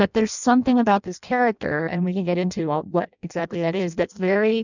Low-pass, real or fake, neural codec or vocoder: 7.2 kHz; fake; codec, 16 kHz in and 24 kHz out, 0.6 kbps, FireRedTTS-2 codec